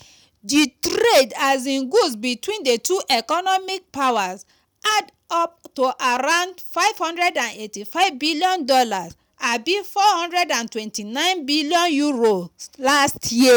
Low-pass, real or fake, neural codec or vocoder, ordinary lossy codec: none; real; none; none